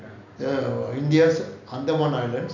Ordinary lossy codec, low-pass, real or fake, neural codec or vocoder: none; 7.2 kHz; real; none